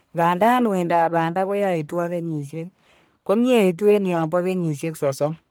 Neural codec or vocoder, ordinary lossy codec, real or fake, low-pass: codec, 44.1 kHz, 1.7 kbps, Pupu-Codec; none; fake; none